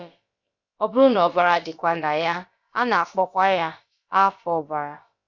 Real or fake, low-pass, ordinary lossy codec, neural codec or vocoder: fake; 7.2 kHz; Opus, 64 kbps; codec, 16 kHz, about 1 kbps, DyCAST, with the encoder's durations